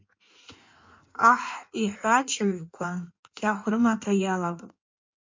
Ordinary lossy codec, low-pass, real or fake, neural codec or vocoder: MP3, 48 kbps; 7.2 kHz; fake; codec, 16 kHz in and 24 kHz out, 1.1 kbps, FireRedTTS-2 codec